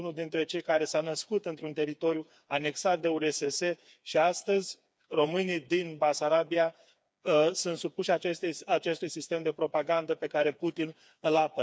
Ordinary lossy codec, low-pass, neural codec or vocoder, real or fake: none; none; codec, 16 kHz, 4 kbps, FreqCodec, smaller model; fake